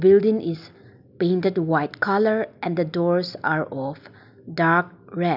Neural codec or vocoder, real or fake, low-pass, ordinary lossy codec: none; real; 5.4 kHz; none